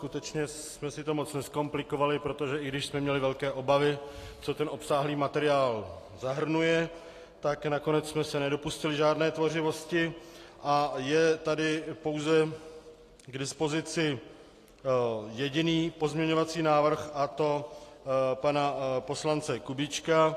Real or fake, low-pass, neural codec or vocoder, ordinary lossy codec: real; 14.4 kHz; none; AAC, 48 kbps